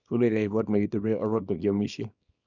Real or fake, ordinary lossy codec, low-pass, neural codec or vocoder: fake; none; 7.2 kHz; codec, 24 kHz, 0.9 kbps, WavTokenizer, small release